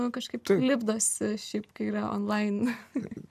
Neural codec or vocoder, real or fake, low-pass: none; real; 14.4 kHz